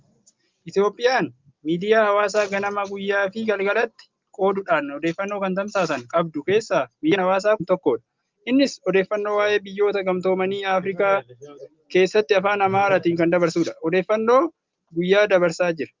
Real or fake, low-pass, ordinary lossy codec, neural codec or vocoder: real; 7.2 kHz; Opus, 24 kbps; none